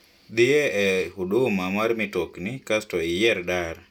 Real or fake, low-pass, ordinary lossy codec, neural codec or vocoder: real; 19.8 kHz; none; none